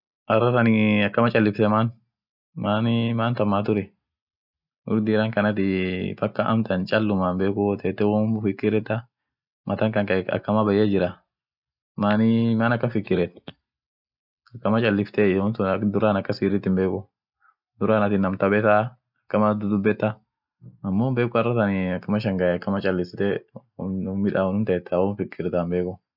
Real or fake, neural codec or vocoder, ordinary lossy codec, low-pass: real; none; none; 5.4 kHz